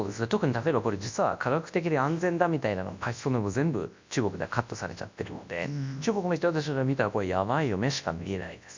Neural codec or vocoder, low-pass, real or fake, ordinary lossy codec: codec, 24 kHz, 0.9 kbps, WavTokenizer, large speech release; 7.2 kHz; fake; MP3, 64 kbps